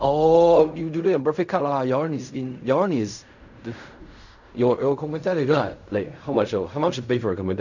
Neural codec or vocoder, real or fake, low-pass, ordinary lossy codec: codec, 16 kHz in and 24 kHz out, 0.4 kbps, LongCat-Audio-Codec, fine tuned four codebook decoder; fake; 7.2 kHz; none